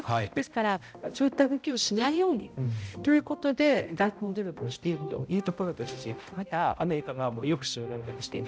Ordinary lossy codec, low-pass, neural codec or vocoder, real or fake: none; none; codec, 16 kHz, 0.5 kbps, X-Codec, HuBERT features, trained on balanced general audio; fake